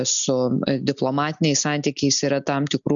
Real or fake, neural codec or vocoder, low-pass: real; none; 7.2 kHz